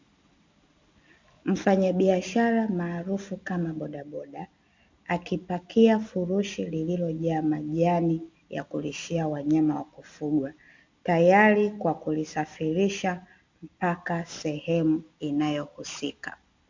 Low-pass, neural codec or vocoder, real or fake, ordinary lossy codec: 7.2 kHz; none; real; MP3, 64 kbps